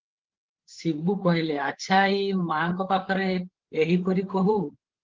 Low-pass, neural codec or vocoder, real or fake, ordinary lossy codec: 7.2 kHz; codec, 16 kHz, 8 kbps, FreqCodec, larger model; fake; Opus, 16 kbps